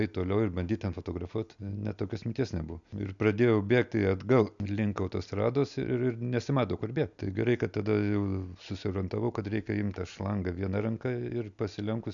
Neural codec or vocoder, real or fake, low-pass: none; real; 7.2 kHz